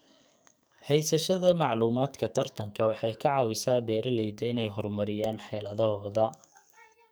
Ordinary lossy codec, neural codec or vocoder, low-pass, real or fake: none; codec, 44.1 kHz, 2.6 kbps, SNAC; none; fake